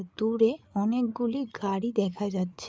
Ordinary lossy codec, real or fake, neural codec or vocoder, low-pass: none; fake; codec, 16 kHz, 8 kbps, FreqCodec, larger model; none